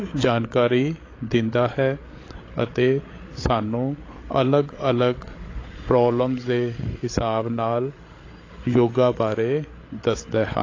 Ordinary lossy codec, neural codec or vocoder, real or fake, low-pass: AAC, 32 kbps; codec, 16 kHz, 8 kbps, FreqCodec, larger model; fake; 7.2 kHz